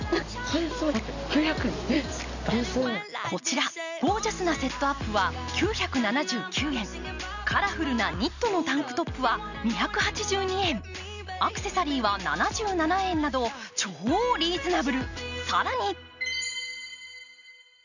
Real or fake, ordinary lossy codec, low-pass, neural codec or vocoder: real; none; 7.2 kHz; none